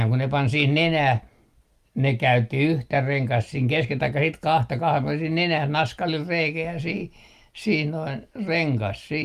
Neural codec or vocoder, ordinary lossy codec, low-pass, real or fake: none; Opus, 32 kbps; 14.4 kHz; real